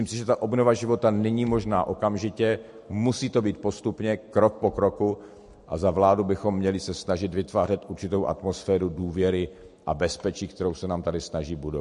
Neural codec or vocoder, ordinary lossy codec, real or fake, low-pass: none; MP3, 48 kbps; real; 14.4 kHz